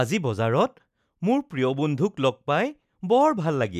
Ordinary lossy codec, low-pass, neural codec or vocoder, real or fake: none; 14.4 kHz; none; real